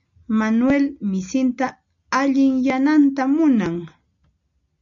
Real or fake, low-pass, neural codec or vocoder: real; 7.2 kHz; none